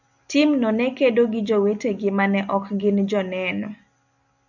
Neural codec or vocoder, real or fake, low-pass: none; real; 7.2 kHz